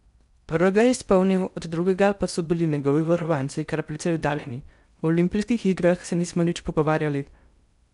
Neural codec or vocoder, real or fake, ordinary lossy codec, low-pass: codec, 16 kHz in and 24 kHz out, 0.6 kbps, FocalCodec, streaming, 4096 codes; fake; none; 10.8 kHz